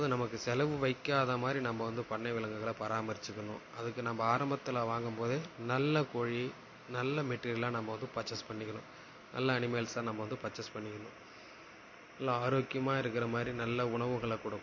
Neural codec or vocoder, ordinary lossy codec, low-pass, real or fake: none; MP3, 32 kbps; 7.2 kHz; real